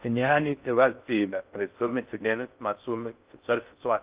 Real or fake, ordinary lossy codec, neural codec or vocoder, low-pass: fake; Opus, 24 kbps; codec, 16 kHz in and 24 kHz out, 0.6 kbps, FocalCodec, streaming, 4096 codes; 3.6 kHz